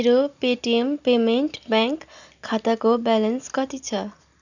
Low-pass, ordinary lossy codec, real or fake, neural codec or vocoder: 7.2 kHz; none; real; none